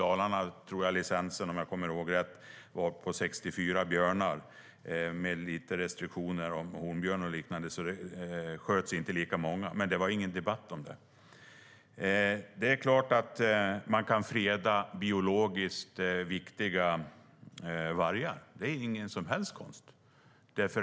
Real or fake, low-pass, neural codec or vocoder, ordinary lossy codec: real; none; none; none